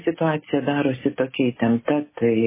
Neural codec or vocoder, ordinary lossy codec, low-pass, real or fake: none; MP3, 16 kbps; 3.6 kHz; real